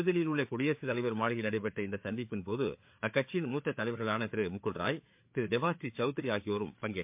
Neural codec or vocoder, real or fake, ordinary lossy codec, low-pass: codec, 16 kHz, 4 kbps, FreqCodec, larger model; fake; MP3, 32 kbps; 3.6 kHz